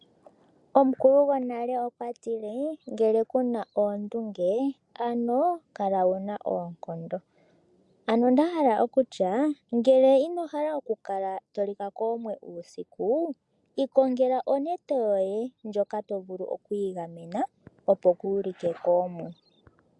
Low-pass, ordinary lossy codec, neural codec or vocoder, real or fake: 10.8 kHz; MP3, 64 kbps; none; real